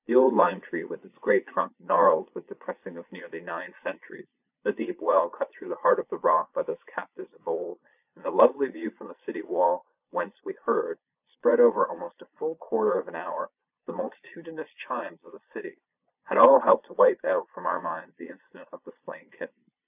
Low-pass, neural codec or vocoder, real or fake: 3.6 kHz; vocoder, 22.05 kHz, 80 mel bands, Vocos; fake